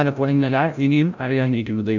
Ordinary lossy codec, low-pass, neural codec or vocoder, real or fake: none; 7.2 kHz; codec, 16 kHz, 0.5 kbps, FreqCodec, larger model; fake